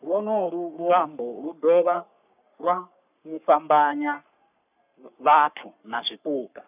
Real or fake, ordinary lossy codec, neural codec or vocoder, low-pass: fake; none; codec, 44.1 kHz, 3.4 kbps, Pupu-Codec; 3.6 kHz